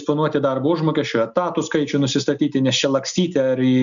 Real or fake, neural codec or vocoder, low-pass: real; none; 7.2 kHz